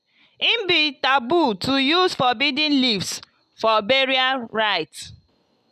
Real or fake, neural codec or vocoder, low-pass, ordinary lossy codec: real; none; 14.4 kHz; none